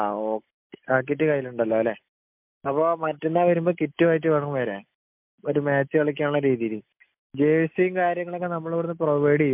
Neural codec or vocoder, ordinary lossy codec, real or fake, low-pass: none; MP3, 32 kbps; real; 3.6 kHz